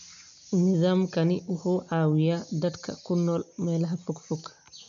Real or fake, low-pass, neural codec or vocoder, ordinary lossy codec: real; 7.2 kHz; none; none